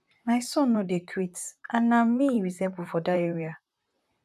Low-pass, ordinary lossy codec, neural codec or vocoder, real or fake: 14.4 kHz; none; vocoder, 44.1 kHz, 128 mel bands, Pupu-Vocoder; fake